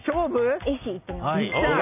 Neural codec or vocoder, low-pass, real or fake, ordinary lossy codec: none; 3.6 kHz; real; none